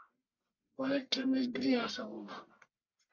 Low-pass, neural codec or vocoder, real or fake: 7.2 kHz; codec, 44.1 kHz, 1.7 kbps, Pupu-Codec; fake